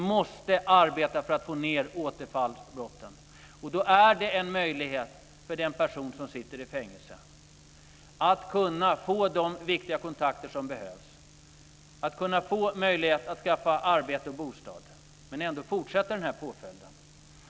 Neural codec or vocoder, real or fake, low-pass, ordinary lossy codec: none; real; none; none